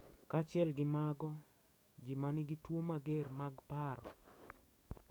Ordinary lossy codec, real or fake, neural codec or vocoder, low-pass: none; fake; codec, 44.1 kHz, 7.8 kbps, DAC; none